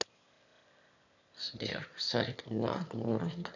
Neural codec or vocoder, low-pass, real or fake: autoencoder, 22.05 kHz, a latent of 192 numbers a frame, VITS, trained on one speaker; 7.2 kHz; fake